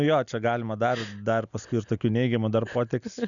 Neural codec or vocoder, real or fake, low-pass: none; real; 7.2 kHz